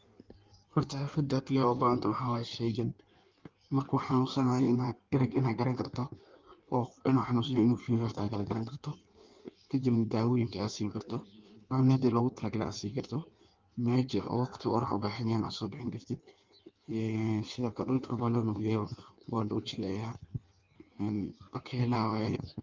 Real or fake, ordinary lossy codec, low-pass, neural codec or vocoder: fake; Opus, 32 kbps; 7.2 kHz; codec, 16 kHz in and 24 kHz out, 1.1 kbps, FireRedTTS-2 codec